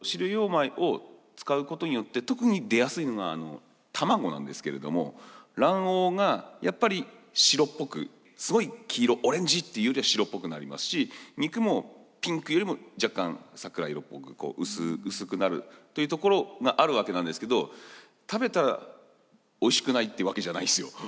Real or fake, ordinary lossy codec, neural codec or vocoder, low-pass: real; none; none; none